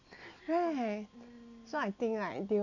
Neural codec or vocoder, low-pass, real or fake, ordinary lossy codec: none; 7.2 kHz; real; none